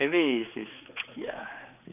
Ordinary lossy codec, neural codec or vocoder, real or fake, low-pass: none; codec, 16 kHz, 4 kbps, X-Codec, HuBERT features, trained on general audio; fake; 3.6 kHz